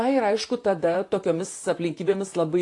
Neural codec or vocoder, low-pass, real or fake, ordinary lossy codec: vocoder, 44.1 kHz, 128 mel bands, Pupu-Vocoder; 10.8 kHz; fake; AAC, 48 kbps